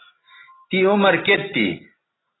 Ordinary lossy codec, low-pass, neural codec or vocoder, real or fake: AAC, 16 kbps; 7.2 kHz; none; real